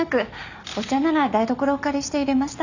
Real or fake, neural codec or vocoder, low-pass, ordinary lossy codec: real; none; 7.2 kHz; none